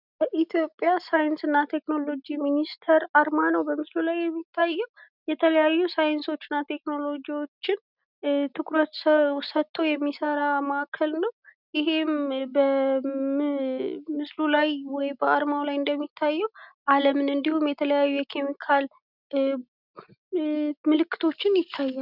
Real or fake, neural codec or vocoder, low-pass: real; none; 5.4 kHz